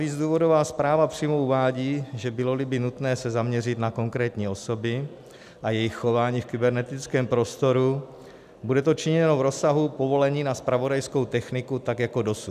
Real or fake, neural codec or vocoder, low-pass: real; none; 14.4 kHz